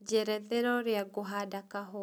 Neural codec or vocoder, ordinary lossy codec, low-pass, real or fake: none; none; none; real